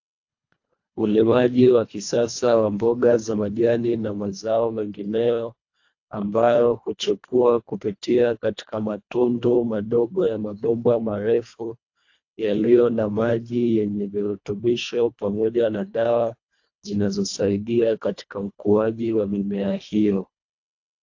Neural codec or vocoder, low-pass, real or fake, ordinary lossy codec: codec, 24 kHz, 1.5 kbps, HILCodec; 7.2 kHz; fake; AAC, 48 kbps